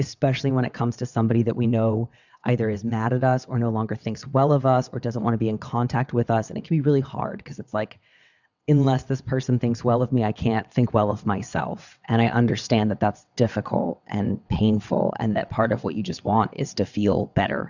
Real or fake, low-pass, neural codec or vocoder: fake; 7.2 kHz; vocoder, 22.05 kHz, 80 mel bands, WaveNeXt